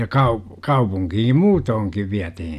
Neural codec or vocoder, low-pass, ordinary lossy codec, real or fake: none; 14.4 kHz; none; real